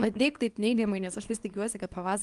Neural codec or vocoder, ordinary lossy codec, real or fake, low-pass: codec, 24 kHz, 0.9 kbps, WavTokenizer, small release; Opus, 32 kbps; fake; 10.8 kHz